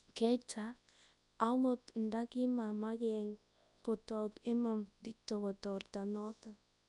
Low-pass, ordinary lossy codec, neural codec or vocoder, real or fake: 10.8 kHz; none; codec, 24 kHz, 0.9 kbps, WavTokenizer, large speech release; fake